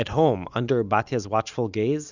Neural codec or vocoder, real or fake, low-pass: none; real; 7.2 kHz